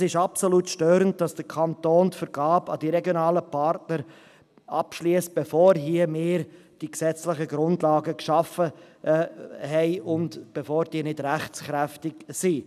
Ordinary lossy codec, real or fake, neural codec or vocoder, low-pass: none; real; none; 14.4 kHz